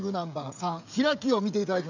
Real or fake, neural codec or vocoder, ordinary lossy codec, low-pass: fake; codec, 16 kHz, 16 kbps, FreqCodec, smaller model; none; 7.2 kHz